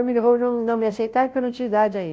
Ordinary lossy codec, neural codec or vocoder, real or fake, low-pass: none; codec, 16 kHz, 0.5 kbps, FunCodec, trained on Chinese and English, 25 frames a second; fake; none